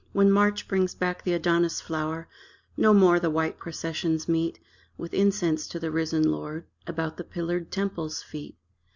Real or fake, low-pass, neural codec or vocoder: real; 7.2 kHz; none